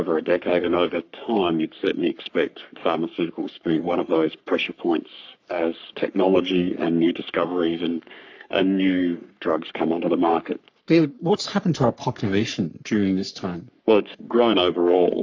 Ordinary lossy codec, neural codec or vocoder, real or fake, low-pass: AAC, 48 kbps; codec, 44.1 kHz, 3.4 kbps, Pupu-Codec; fake; 7.2 kHz